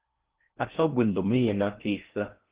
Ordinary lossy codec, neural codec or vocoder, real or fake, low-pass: Opus, 16 kbps; codec, 16 kHz in and 24 kHz out, 0.6 kbps, FocalCodec, streaming, 4096 codes; fake; 3.6 kHz